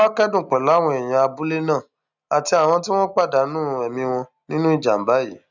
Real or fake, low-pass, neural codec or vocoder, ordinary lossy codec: real; 7.2 kHz; none; none